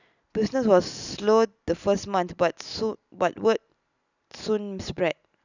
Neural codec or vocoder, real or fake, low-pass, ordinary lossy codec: none; real; 7.2 kHz; none